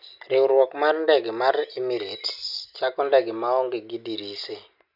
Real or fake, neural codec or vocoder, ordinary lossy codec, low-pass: real; none; none; 5.4 kHz